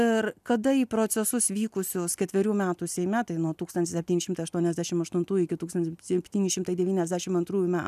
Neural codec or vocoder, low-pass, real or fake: none; 14.4 kHz; real